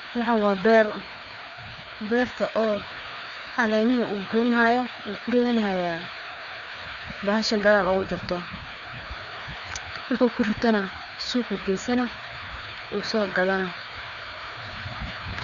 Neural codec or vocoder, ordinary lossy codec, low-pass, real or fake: codec, 16 kHz, 2 kbps, FreqCodec, larger model; none; 7.2 kHz; fake